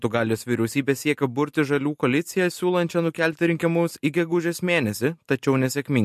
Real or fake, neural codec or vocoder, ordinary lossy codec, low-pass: real; none; MP3, 64 kbps; 14.4 kHz